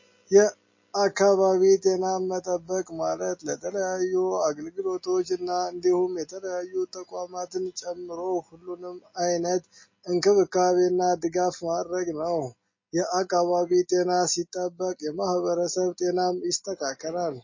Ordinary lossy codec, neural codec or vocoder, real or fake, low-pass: MP3, 32 kbps; none; real; 7.2 kHz